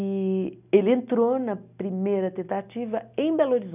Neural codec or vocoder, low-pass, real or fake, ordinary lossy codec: none; 3.6 kHz; real; none